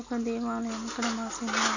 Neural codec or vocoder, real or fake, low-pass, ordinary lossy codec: none; real; 7.2 kHz; none